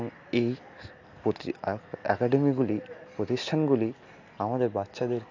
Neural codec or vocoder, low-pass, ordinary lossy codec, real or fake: none; 7.2 kHz; AAC, 48 kbps; real